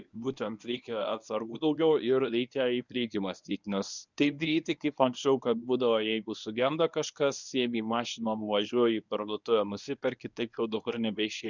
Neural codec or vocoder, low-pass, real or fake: codec, 24 kHz, 0.9 kbps, WavTokenizer, medium speech release version 1; 7.2 kHz; fake